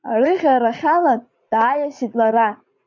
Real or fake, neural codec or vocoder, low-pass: fake; vocoder, 44.1 kHz, 80 mel bands, Vocos; 7.2 kHz